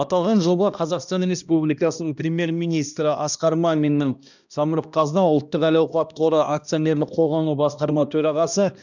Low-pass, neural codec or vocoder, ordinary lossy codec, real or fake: 7.2 kHz; codec, 16 kHz, 1 kbps, X-Codec, HuBERT features, trained on balanced general audio; none; fake